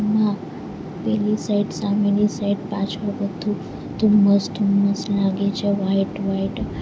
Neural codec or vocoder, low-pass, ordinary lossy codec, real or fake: none; none; none; real